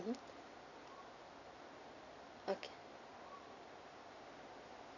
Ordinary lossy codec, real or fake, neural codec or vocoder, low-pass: none; real; none; 7.2 kHz